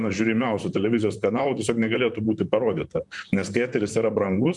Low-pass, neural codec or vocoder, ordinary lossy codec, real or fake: 10.8 kHz; vocoder, 44.1 kHz, 128 mel bands, Pupu-Vocoder; MP3, 96 kbps; fake